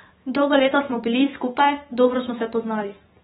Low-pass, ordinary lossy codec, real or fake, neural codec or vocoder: 19.8 kHz; AAC, 16 kbps; fake; codec, 44.1 kHz, 7.8 kbps, DAC